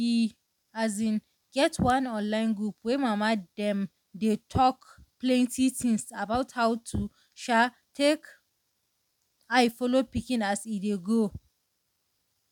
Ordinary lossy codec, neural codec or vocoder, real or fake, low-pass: none; none; real; 19.8 kHz